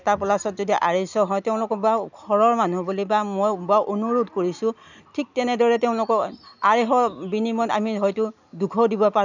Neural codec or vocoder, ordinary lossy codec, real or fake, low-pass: none; none; real; 7.2 kHz